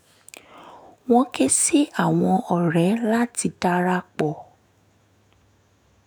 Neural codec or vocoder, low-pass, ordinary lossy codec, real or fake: autoencoder, 48 kHz, 128 numbers a frame, DAC-VAE, trained on Japanese speech; none; none; fake